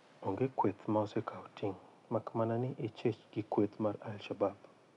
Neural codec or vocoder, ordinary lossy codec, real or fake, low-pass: none; none; real; 10.8 kHz